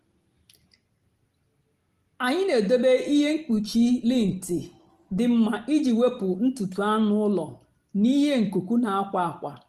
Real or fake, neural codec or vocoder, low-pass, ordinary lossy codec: real; none; 14.4 kHz; Opus, 24 kbps